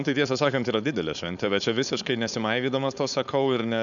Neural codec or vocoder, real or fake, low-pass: codec, 16 kHz, 4.8 kbps, FACodec; fake; 7.2 kHz